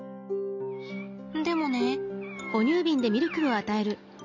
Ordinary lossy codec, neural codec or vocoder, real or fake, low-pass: none; none; real; 7.2 kHz